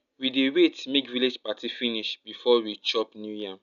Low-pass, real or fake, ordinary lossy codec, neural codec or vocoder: 7.2 kHz; real; none; none